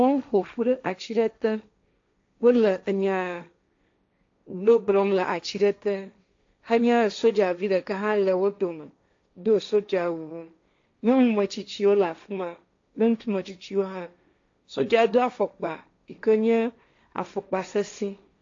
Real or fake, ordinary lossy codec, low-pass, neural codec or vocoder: fake; AAC, 48 kbps; 7.2 kHz; codec, 16 kHz, 1.1 kbps, Voila-Tokenizer